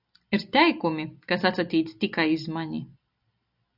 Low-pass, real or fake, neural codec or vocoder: 5.4 kHz; real; none